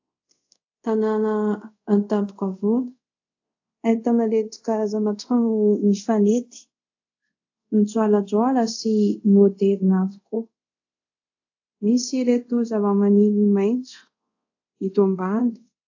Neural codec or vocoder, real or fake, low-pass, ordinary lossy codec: codec, 24 kHz, 0.5 kbps, DualCodec; fake; 7.2 kHz; AAC, 48 kbps